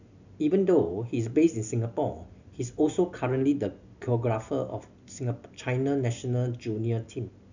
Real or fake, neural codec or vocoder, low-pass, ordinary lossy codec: real; none; 7.2 kHz; none